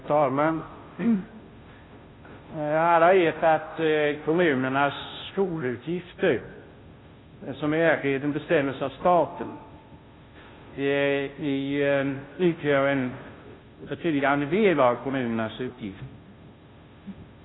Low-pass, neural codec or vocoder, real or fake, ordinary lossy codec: 7.2 kHz; codec, 16 kHz, 0.5 kbps, FunCodec, trained on Chinese and English, 25 frames a second; fake; AAC, 16 kbps